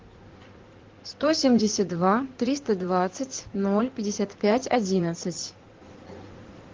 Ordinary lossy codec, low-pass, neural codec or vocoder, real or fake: Opus, 16 kbps; 7.2 kHz; codec, 16 kHz in and 24 kHz out, 2.2 kbps, FireRedTTS-2 codec; fake